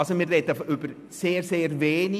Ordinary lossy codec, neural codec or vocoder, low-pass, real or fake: none; none; 14.4 kHz; real